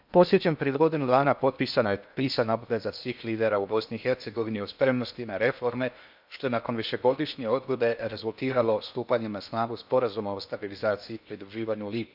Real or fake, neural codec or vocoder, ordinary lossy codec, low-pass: fake; codec, 16 kHz in and 24 kHz out, 0.6 kbps, FocalCodec, streaming, 2048 codes; none; 5.4 kHz